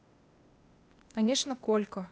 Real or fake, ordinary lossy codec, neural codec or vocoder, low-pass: fake; none; codec, 16 kHz, 0.8 kbps, ZipCodec; none